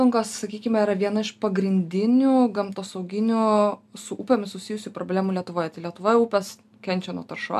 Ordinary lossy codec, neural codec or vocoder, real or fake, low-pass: AAC, 96 kbps; none; real; 14.4 kHz